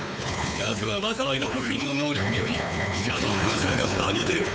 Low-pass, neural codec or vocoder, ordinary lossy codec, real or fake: none; codec, 16 kHz, 4 kbps, X-Codec, WavLM features, trained on Multilingual LibriSpeech; none; fake